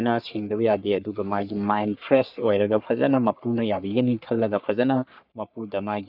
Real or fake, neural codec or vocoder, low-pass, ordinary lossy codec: fake; codec, 44.1 kHz, 3.4 kbps, Pupu-Codec; 5.4 kHz; none